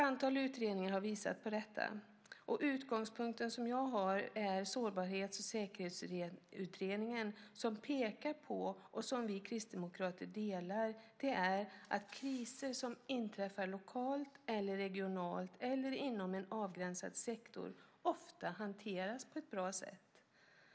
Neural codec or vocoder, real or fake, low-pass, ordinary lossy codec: none; real; none; none